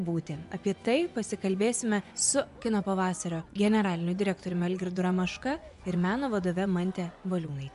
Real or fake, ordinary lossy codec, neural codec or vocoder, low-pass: fake; AAC, 96 kbps; vocoder, 24 kHz, 100 mel bands, Vocos; 10.8 kHz